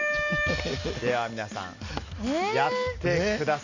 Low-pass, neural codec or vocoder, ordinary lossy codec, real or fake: 7.2 kHz; none; none; real